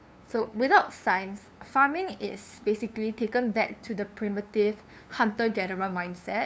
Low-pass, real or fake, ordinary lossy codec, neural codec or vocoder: none; fake; none; codec, 16 kHz, 2 kbps, FunCodec, trained on LibriTTS, 25 frames a second